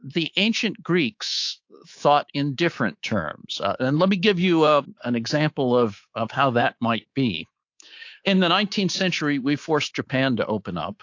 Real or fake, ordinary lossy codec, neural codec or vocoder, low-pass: fake; AAC, 48 kbps; codec, 24 kHz, 3.1 kbps, DualCodec; 7.2 kHz